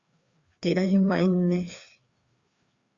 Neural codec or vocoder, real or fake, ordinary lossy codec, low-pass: codec, 16 kHz, 2 kbps, FreqCodec, larger model; fake; Opus, 64 kbps; 7.2 kHz